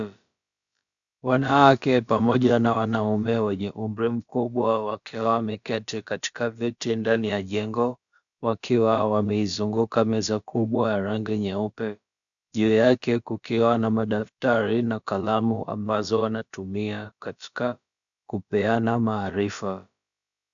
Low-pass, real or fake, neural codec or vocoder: 7.2 kHz; fake; codec, 16 kHz, about 1 kbps, DyCAST, with the encoder's durations